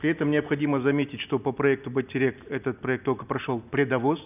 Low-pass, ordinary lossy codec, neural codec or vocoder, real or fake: 3.6 kHz; none; none; real